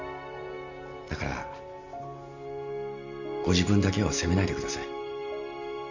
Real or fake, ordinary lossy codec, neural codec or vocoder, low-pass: real; none; none; 7.2 kHz